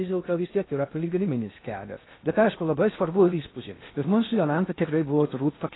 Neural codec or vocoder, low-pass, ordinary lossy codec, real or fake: codec, 16 kHz in and 24 kHz out, 0.6 kbps, FocalCodec, streaming, 2048 codes; 7.2 kHz; AAC, 16 kbps; fake